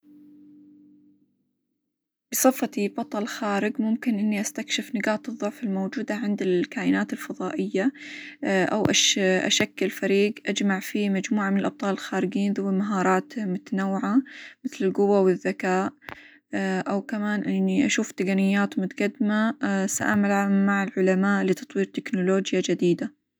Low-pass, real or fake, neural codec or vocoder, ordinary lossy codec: none; real; none; none